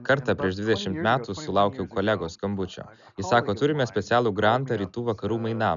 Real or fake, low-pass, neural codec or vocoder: real; 7.2 kHz; none